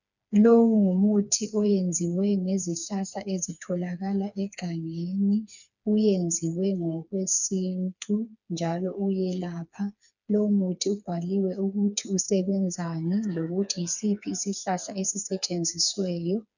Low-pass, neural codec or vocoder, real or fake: 7.2 kHz; codec, 16 kHz, 4 kbps, FreqCodec, smaller model; fake